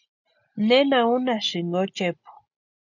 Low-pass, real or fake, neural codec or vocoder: 7.2 kHz; real; none